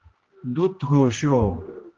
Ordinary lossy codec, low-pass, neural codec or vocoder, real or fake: Opus, 16 kbps; 7.2 kHz; codec, 16 kHz, 1 kbps, X-Codec, HuBERT features, trained on general audio; fake